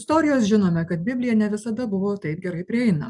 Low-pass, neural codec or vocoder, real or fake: 10.8 kHz; none; real